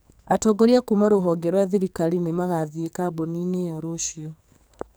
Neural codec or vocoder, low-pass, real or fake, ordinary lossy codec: codec, 44.1 kHz, 2.6 kbps, SNAC; none; fake; none